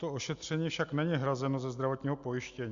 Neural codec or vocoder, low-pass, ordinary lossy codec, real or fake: none; 7.2 kHz; Opus, 64 kbps; real